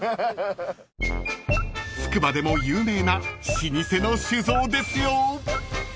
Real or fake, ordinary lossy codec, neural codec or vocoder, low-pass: real; none; none; none